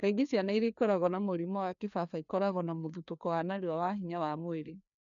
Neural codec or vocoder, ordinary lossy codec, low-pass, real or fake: codec, 16 kHz, 2 kbps, FreqCodec, larger model; none; 7.2 kHz; fake